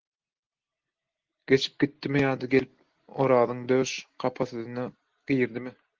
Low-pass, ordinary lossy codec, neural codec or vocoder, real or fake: 7.2 kHz; Opus, 16 kbps; none; real